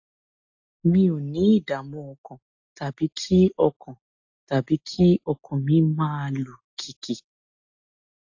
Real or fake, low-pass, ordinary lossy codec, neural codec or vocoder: real; 7.2 kHz; AAC, 48 kbps; none